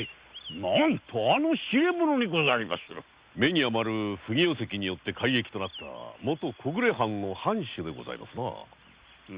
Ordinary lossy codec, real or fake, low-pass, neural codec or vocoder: Opus, 64 kbps; real; 3.6 kHz; none